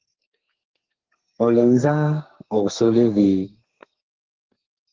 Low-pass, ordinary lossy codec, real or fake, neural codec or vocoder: 7.2 kHz; Opus, 16 kbps; fake; codec, 32 kHz, 1.9 kbps, SNAC